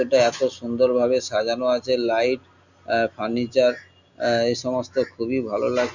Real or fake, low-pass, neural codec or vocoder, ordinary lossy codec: real; 7.2 kHz; none; none